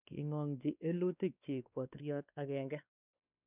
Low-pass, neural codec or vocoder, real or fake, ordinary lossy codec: 3.6 kHz; codec, 16 kHz, 2 kbps, X-Codec, WavLM features, trained on Multilingual LibriSpeech; fake; none